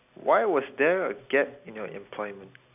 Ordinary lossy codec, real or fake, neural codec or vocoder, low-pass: none; real; none; 3.6 kHz